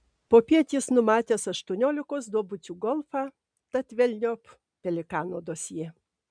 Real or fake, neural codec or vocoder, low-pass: real; none; 9.9 kHz